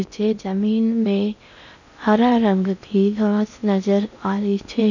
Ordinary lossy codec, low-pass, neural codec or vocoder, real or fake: none; 7.2 kHz; codec, 16 kHz in and 24 kHz out, 0.6 kbps, FocalCodec, streaming, 2048 codes; fake